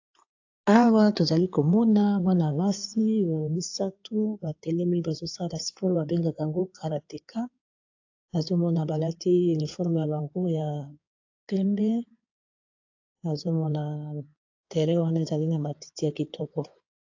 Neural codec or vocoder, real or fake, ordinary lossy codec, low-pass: codec, 16 kHz in and 24 kHz out, 2.2 kbps, FireRedTTS-2 codec; fake; AAC, 48 kbps; 7.2 kHz